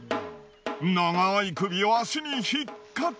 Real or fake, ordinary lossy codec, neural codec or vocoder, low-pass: real; none; none; none